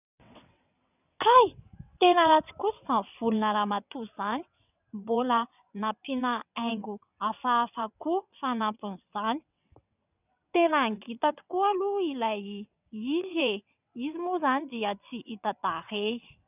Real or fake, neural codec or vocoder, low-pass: fake; vocoder, 22.05 kHz, 80 mel bands, WaveNeXt; 3.6 kHz